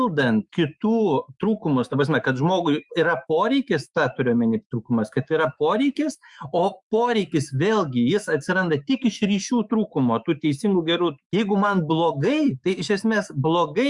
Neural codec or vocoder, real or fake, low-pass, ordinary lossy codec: autoencoder, 48 kHz, 128 numbers a frame, DAC-VAE, trained on Japanese speech; fake; 10.8 kHz; Opus, 64 kbps